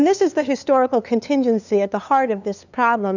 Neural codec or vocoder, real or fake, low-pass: codec, 16 kHz, 2 kbps, FunCodec, trained on LibriTTS, 25 frames a second; fake; 7.2 kHz